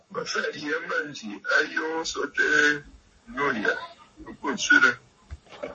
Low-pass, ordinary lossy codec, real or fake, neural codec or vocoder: 10.8 kHz; MP3, 32 kbps; fake; codec, 44.1 kHz, 7.8 kbps, Pupu-Codec